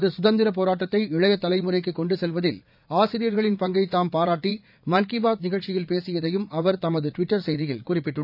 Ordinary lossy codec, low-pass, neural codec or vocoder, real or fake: none; 5.4 kHz; vocoder, 44.1 kHz, 80 mel bands, Vocos; fake